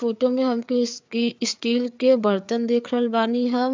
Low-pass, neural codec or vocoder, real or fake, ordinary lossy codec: 7.2 kHz; codec, 16 kHz, 4 kbps, FreqCodec, larger model; fake; MP3, 64 kbps